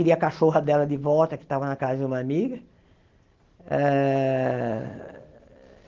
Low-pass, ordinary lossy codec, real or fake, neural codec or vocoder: 7.2 kHz; Opus, 16 kbps; real; none